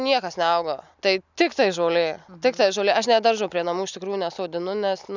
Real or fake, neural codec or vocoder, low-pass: real; none; 7.2 kHz